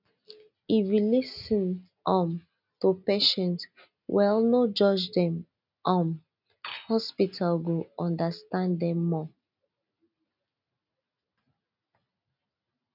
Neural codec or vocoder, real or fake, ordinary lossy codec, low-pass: none; real; none; 5.4 kHz